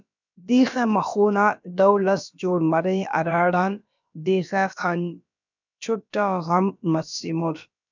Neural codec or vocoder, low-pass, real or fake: codec, 16 kHz, about 1 kbps, DyCAST, with the encoder's durations; 7.2 kHz; fake